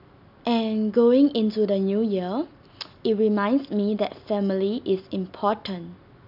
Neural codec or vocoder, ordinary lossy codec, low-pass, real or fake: none; none; 5.4 kHz; real